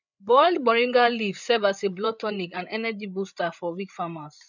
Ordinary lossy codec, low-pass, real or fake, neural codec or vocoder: none; 7.2 kHz; fake; codec, 16 kHz, 8 kbps, FreqCodec, larger model